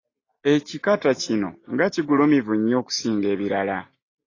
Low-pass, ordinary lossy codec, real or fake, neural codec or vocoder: 7.2 kHz; AAC, 32 kbps; real; none